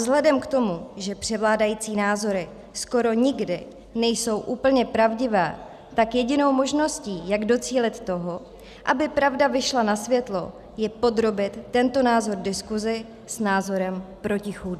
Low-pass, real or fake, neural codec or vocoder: 14.4 kHz; real; none